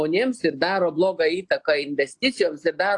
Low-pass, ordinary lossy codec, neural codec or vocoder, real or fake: 10.8 kHz; AAC, 64 kbps; none; real